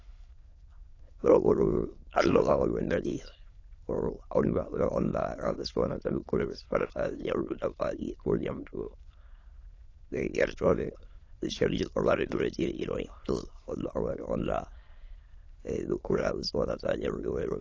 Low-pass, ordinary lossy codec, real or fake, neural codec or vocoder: 7.2 kHz; AAC, 32 kbps; fake; autoencoder, 22.05 kHz, a latent of 192 numbers a frame, VITS, trained on many speakers